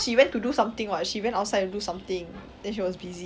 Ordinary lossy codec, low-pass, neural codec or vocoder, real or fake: none; none; none; real